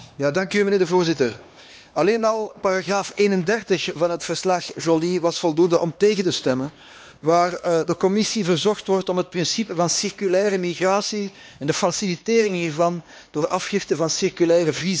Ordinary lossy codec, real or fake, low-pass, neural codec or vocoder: none; fake; none; codec, 16 kHz, 2 kbps, X-Codec, HuBERT features, trained on LibriSpeech